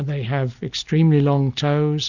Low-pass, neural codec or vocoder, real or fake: 7.2 kHz; none; real